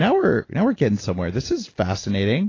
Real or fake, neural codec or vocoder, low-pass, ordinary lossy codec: real; none; 7.2 kHz; AAC, 32 kbps